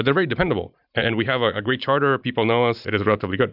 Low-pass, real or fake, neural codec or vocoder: 5.4 kHz; fake; vocoder, 44.1 kHz, 128 mel bands every 512 samples, BigVGAN v2